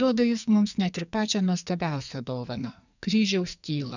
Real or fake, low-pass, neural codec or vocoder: fake; 7.2 kHz; codec, 44.1 kHz, 2.6 kbps, SNAC